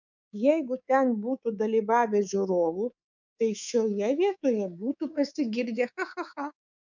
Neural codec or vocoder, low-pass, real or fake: codec, 24 kHz, 3.1 kbps, DualCodec; 7.2 kHz; fake